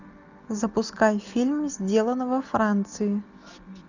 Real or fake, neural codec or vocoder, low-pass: real; none; 7.2 kHz